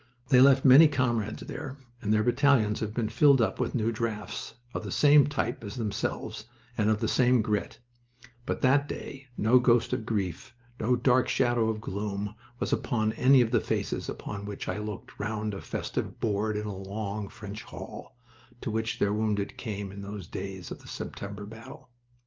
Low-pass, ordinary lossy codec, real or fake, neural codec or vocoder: 7.2 kHz; Opus, 24 kbps; real; none